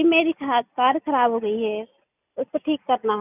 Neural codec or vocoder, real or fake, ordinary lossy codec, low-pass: none; real; none; 3.6 kHz